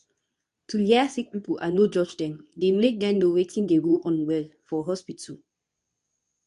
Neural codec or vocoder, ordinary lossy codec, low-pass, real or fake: codec, 24 kHz, 0.9 kbps, WavTokenizer, medium speech release version 2; none; 10.8 kHz; fake